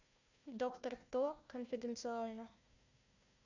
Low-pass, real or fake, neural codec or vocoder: 7.2 kHz; fake; codec, 16 kHz, 1 kbps, FunCodec, trained on Chinese and English, 50 frames a second